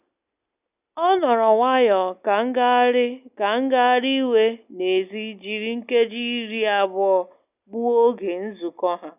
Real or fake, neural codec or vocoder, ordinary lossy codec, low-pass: real; none; none; 3.6 kHz